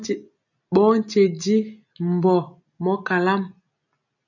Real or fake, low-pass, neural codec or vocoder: real; 7.2 kHz; none